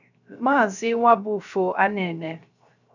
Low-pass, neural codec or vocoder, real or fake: 7.2 kHz; codec, 16 kHz, 0.3 kbps, FocalCodec; fake